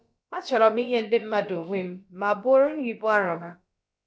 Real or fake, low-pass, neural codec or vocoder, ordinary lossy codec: fake; none; codec, 16 kHz, about 1 kbps, DyCAST, with the encoder's durations; none